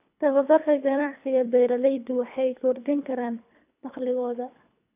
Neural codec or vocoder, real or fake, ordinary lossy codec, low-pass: codec, 24 kHz, 3 kbps, HILCodec; fake; none; 3.6 kHz